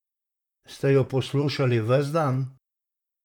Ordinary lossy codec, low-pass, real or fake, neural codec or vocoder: none; 19.8 kHz; fake; vocoder, 44.1 kHz, 128 mel bands, Pupu-Vocoder